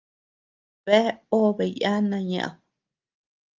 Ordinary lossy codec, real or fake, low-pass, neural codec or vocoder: Opus, 32 kbps; real; 7.2 kHz; none